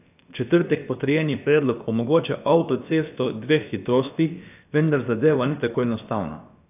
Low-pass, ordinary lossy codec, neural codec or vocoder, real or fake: 3.6 kHz; AAC, 32 kbps; codec, 16 kHz, about 1 kbps, DyCAST, with the encoder's durations; fake